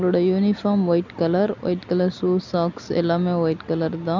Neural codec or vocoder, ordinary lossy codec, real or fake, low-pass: none; MP3, 64 kbps; real; 7.2 kHz